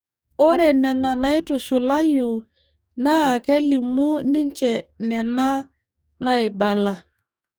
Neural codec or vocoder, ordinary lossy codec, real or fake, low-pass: codec, 44.1 kHz, 2.6 kbps, DAC; none; fake; none